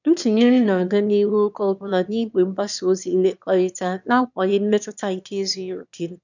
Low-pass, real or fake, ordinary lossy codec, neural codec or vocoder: 7.2 kHz; fake; none; autoencoder, 22.05 kHz, a latent of 192 numbers a frame, VITS, trained on one speaker